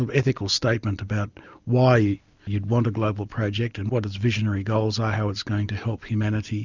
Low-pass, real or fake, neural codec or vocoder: 7.2 kHz; real; none